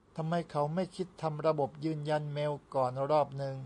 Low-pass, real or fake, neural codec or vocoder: 10.8 kHz; real; none